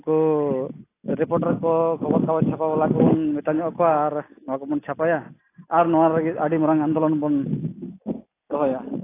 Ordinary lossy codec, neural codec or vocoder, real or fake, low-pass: AAC, 24 kbps; none; real; 3.6 kHz